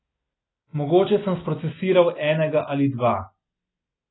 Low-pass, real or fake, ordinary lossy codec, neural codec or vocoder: 7.2 kHz; real; AAC, 16 kbps; none